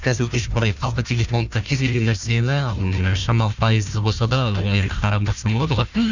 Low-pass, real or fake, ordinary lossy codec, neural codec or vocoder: 7.2 kHz; fake; none; codec, 16 kHz, 1 kbps, FunCodec, trained on Chinese and English, 50 frames a second